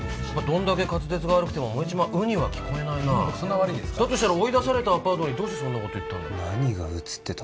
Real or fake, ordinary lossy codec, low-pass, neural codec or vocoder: real; none; none; none